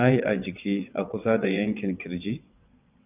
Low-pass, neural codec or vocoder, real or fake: 3.6 kHz; vocoder, 22.05 kHz, 80 mel bands, WaveNeXt; fake